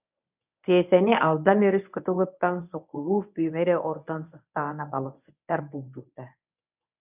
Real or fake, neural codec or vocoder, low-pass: fake; codec, 24 kHz, 0.9 kbps, WavTokenizer, medium speech release version 1; 3.6 kHz